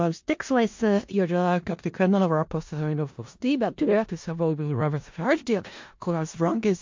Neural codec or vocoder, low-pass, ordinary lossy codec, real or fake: codec, 16 kHz in and 24 kHz out, 0.4 kbps, LongCat-Audio-Codec, four codebook decoder; 7.2 kHz; MP3, 48 kbps; fake